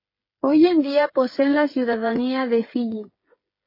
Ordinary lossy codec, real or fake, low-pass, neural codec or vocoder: MP3, 24 kbps; fake; 5.4 kHz; codec, 16 kHz, 8 kbps, FreqCodec, smaller model